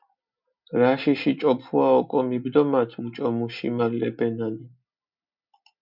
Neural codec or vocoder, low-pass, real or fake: none; 5.4 kHz; real